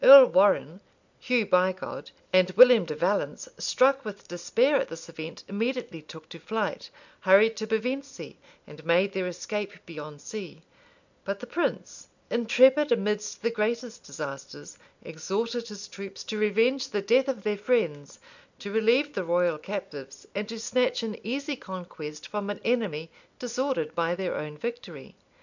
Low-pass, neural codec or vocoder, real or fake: 7.2 kHz; none; real